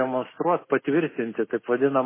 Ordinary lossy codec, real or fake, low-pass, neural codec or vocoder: MP3, 16 kbps; real; 3.6 kHz; none